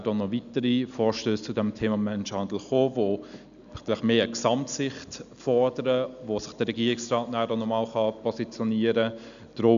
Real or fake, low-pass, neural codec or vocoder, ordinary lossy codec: real; 7.2 kHz; none; none